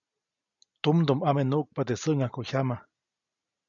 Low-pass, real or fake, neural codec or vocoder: 7.2 kHz; real; none